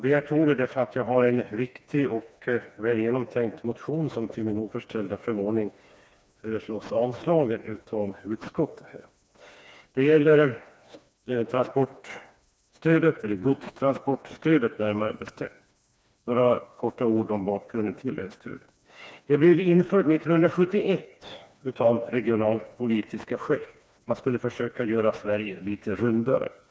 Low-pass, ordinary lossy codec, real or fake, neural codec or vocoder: none; none; fake; codec, 16 kHz, 2 kbps, FreqCodec, smaller model